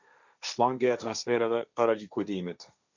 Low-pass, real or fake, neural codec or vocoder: 7.2 kHz; fake; codec, 16 kHz, 1.1 kbps, Voila-Tokenizer